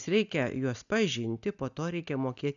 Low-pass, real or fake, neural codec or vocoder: 7.2 kHz; real; none